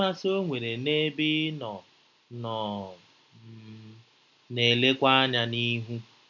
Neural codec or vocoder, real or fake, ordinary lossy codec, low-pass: none; real; none; 7.2 kHz